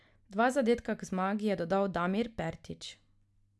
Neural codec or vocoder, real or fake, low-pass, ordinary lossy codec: none; real; none; none